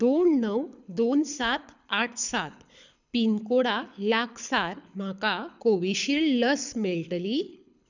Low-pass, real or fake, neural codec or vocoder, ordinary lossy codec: 7.2 kHz; fake; codec, 24 kHz, 6 kbps, HILCodec; none